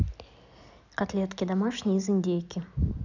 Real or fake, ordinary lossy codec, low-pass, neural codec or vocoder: real; none; 7.2 kHz; none